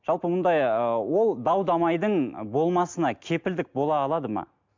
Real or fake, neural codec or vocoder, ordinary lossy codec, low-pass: real; none; MP3, 64 kbps; 7.2 kHz